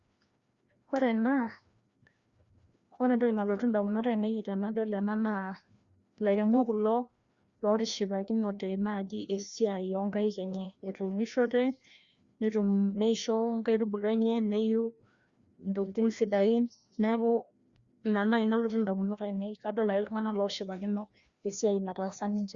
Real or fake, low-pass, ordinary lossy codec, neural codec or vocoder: fake; 7.2 kHz; Opus, 64 kbps; codec, 16 kHz, 1 kbps, FreqCodec, larger model